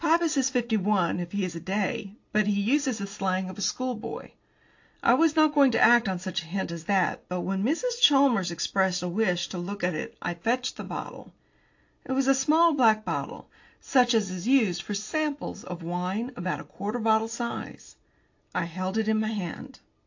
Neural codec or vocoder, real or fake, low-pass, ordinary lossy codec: none; real; 7.2 kHz; AAC, 48 kbps